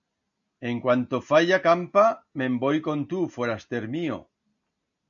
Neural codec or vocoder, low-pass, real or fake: none; 7.2 kHz; real